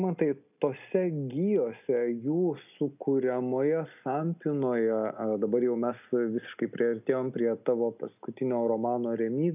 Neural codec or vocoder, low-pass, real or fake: none; 3.6 kHz; real